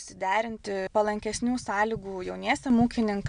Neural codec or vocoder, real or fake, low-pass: none; real; 9.9 kHz